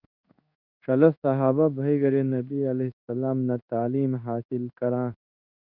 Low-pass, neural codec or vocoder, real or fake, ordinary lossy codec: 5.4 kHz; codec, 16 kHz in and 24 kHz out, 1 kbps, XY-Tokenizer; fake; Opus, 64 kbps